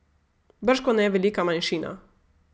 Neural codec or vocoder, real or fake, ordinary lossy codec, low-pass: none; real; none; none